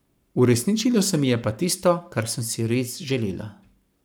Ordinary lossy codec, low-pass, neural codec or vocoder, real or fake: none; none; codec, 44.1 kHz, 7.8 kbps, Pupu-Codec; fake